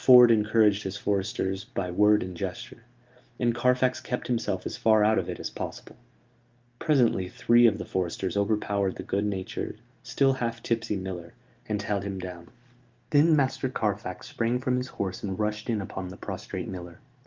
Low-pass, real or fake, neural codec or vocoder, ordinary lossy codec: 7.2 kHz; real; none; Opus, 32 kbps